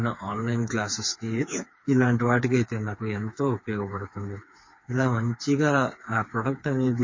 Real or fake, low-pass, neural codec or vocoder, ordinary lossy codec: fake; 7.2 kHz; codec, 16 kHz, 4 kbps, FreqCodec, smaller model; MP3, 32 kbps